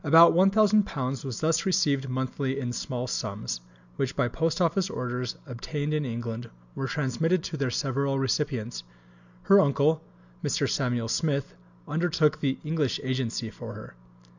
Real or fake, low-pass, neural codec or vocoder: real; 7.2 kHz; none